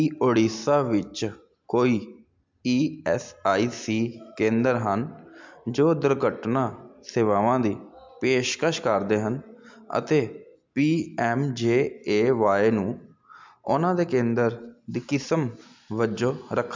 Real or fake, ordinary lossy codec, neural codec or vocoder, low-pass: real; MP3, 64 kbps; none; 7.2 kHz